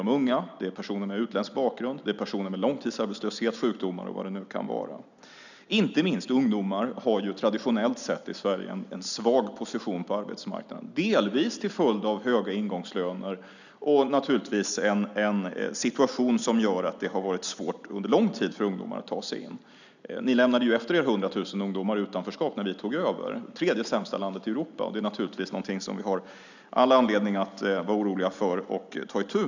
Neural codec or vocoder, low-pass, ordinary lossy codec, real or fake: none; 7.2 kHz; none; real